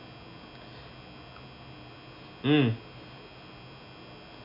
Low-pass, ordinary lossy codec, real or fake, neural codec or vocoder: 5.4 kHz; none; real; none